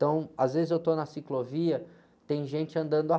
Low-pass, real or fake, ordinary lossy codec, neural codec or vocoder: none; real; none; none